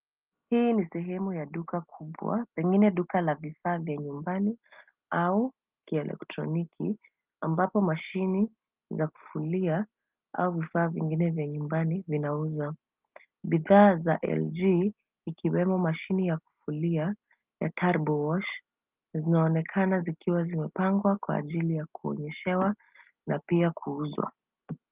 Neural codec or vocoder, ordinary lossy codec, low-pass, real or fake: none; Opus, 32 kbps; 3.6 kHz; real